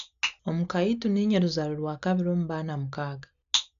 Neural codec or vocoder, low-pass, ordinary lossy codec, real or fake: none; 7.2 kHz; none; real